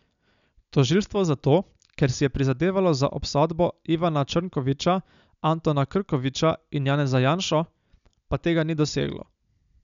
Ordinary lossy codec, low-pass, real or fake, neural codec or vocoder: none; 7.2 kHz; real; none